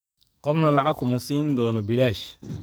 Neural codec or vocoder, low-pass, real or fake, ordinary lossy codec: codec, 44.1 kHz, 2.6 kbps, SNAC; none; fake; none